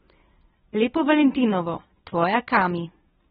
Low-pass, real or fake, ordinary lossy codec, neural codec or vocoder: 9.9 kHz; real; AAC, 16 kbps; none